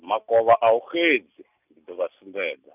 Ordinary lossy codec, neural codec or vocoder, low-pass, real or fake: none; none; 3.6 kHz; real